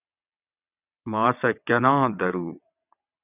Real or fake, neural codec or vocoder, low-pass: fake; vocoder, 22.05 kHz, 80 mel bands, WaveNeXt; 3.6 kHz